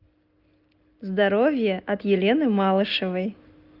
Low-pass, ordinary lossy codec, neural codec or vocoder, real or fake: 5.4 kHz; Opus, 32 kbps; none; real